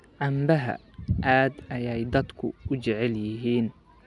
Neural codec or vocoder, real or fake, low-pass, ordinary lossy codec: none; real; 10.8 kHz; none